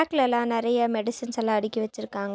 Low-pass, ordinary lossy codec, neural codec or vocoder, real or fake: none; none; none; real